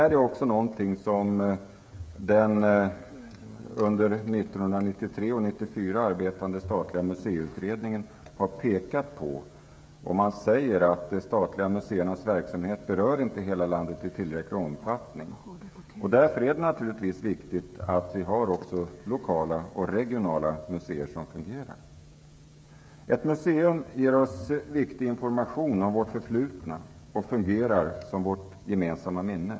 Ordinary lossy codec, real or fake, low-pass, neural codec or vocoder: none; fake; none; codec, 16 kHz, 16 kbps, FreqCodec, smaller model